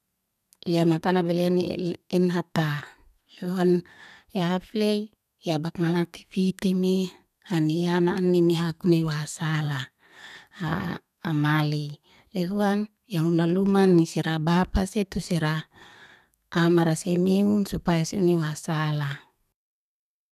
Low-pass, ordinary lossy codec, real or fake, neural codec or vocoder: 14.4 kHz; none; fake; codec, 32 kHz, 1.9 kbps, SNAC